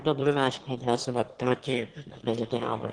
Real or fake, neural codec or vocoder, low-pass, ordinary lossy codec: fake; autoencoder, 22.05 kHz, a latent of 192 numbers a frame, VITS, trained on one speaker; 9.9 kHz; Opus, 16 kbps